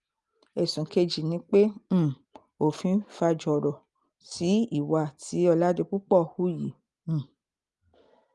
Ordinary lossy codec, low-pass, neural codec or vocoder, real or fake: Opus, 32 kbps; 10.8 kHz; none; real